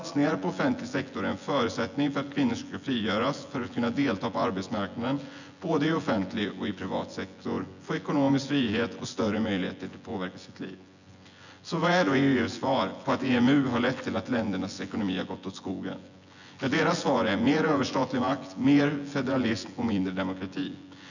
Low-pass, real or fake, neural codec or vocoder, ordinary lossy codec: 7.2 kHz; fake; vocoder, 24 kHz, 100 mel bands, Vocos; none